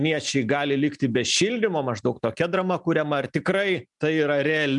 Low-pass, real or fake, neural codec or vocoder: 10.8 kHz; real; none